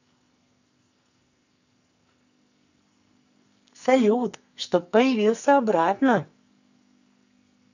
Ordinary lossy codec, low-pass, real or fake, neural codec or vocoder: none; 7.2 kHz; fake; codec, 44.1 kHz, 2.6 kbps, SNAC